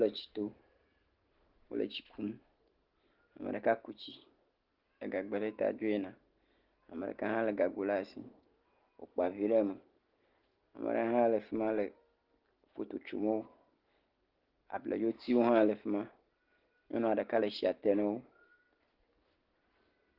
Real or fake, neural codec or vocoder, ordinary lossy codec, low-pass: real; none; Opus, 16 kbps; 5.4 kHz